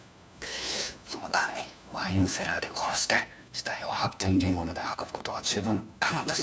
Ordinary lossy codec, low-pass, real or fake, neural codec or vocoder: none; none; fake; codec, 16 kHz, 1 kbps, FunCodec, trained on LibriTTS, 50 frames a second